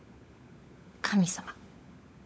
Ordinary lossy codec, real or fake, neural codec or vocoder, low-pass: none; fake; codec, 16 kHz, 16 kbps, FunCodec, trained on LibriTTS, 50 frames a second; none